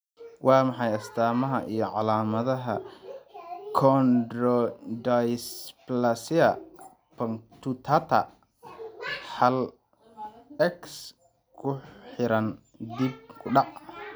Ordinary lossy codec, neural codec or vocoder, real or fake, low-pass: none; none; real; none